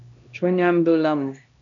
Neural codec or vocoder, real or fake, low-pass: codec, 16 kHz, 1 kbps, X-Codec, HuBERT features, trained on LibriSpeech; fake; 7.2 kHz